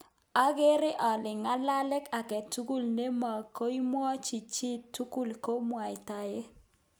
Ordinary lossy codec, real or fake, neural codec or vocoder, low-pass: none; real; none; none